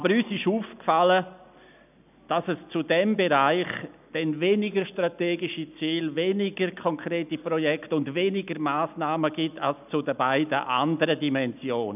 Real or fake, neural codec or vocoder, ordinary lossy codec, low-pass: real; none; none; 3.6 kHz